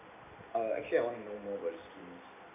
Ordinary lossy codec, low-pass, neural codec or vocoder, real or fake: none; 3.6 kHz; none; real